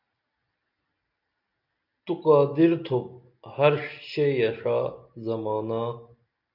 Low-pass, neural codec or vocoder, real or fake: 5.4 kHz; none; real